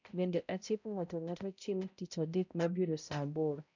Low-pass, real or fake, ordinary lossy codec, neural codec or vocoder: 7.2 kHz; fake; none; codec, 16 kHz, 0.5 kbps, X-Codec, HuBERT features, trained on balanced general audio